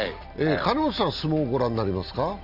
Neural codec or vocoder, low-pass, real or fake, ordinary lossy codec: none; 5.4 kHz; real; none